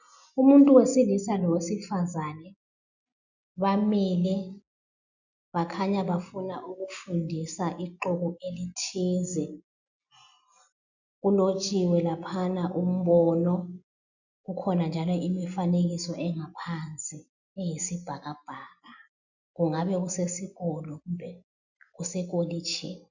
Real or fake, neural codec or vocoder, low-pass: real; none; 7.2 kHz